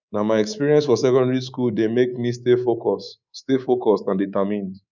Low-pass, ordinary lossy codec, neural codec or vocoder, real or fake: 7.2 kHz; none; codec, 24 kHz, 3.1 kbps, DualCodec; fake